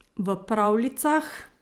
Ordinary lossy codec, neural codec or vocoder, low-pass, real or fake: Opus, 32 kbps; vocoder, 44.1 kHz, 128 mel bands every 512 samples, BigVGAN v2; 19.8 kHz; fake